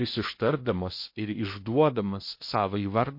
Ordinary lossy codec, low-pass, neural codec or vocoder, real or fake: MP3, 32 kbps; 5.4 kHz; codec, 16 kHz in and 24 kHz out, 0.8 kbps, FocalCodec, streaming, 65536 codes; fake